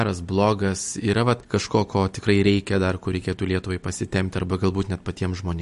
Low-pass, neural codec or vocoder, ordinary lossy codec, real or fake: 14.4 kHz; none; MP3, 48 kbps; real